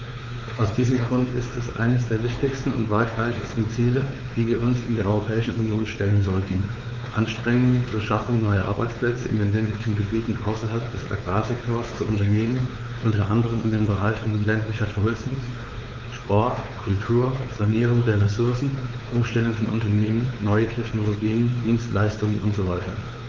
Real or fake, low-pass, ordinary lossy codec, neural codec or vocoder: fake; 7.2 kHz; Opus, 32 kbps; codec, 16 kHz, 4 kbps, X-Codec, WavLM features, trained on Multilingual LibriSpeech